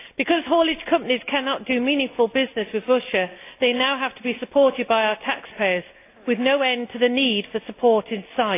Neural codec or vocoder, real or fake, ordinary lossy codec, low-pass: none; real; AAC, 24 kbps; 3.6 kHz